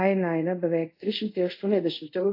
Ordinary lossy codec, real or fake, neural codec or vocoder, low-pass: AAC, 32 kbps; fake; codec, 24 kHz, 0.5 kbps, DualCodec; 5.4 kHz